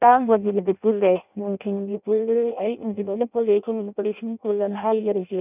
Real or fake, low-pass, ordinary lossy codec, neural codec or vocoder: fake; 3.6 kHz; none; codec, 16 kHz in and 24 kHz out, 0.6 kbps, FireRedTTS-2 codec